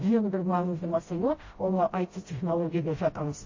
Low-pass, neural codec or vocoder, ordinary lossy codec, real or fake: 7.2 kHz; codec, 16 kHz, 0.5 kbps, FreqCodec, smaller model; MP3, 32 kbps; fake